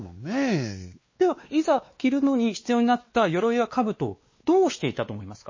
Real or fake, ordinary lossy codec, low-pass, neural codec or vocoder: fake; MP3, 32 kbps; 7.2 kHz; codec, 16 kHz, 2 kbps, X-Codec, WavLM features, trained on Multilingual LibriSpeech